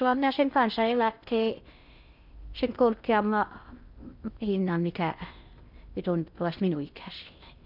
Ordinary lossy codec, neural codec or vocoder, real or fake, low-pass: none; codec, 16 kHz in and 24 kHz out, 0.6 kbps, FocalCodec, streaming, 2048 codes; fake; 5.4 kHz